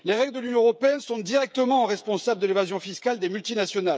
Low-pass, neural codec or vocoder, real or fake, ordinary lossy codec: none; codec, 16 kHz, 8 kbps, FreqCodec, smaller model; fake; none